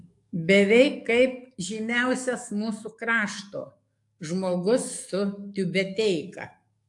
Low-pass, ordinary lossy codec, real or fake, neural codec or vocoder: 10.8 kHz; AAC, 64 kbps; fake; codec, 44.1 kHz, 7.8 kbps, DAC